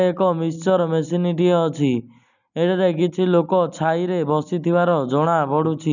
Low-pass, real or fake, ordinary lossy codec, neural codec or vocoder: 7.2 kHz; real; none; none